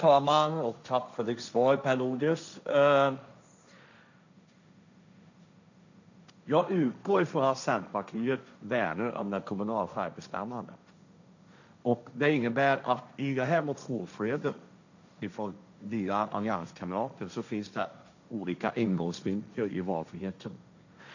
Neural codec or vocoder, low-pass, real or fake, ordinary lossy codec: codec, 16 kHz, 1.1 kbps, Voila-Tokenizer; 7.2 kHz; fake; none